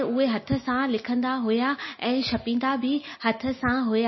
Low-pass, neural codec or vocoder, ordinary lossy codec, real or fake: 7.2 kHz; none; MP3, 24 kbps; real